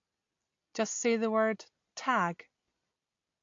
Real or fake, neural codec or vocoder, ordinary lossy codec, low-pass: real; none; AAC, 48 kbps; 7.2 kHz